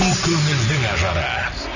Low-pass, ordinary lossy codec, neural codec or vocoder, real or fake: 7.2 kHz; none; none; real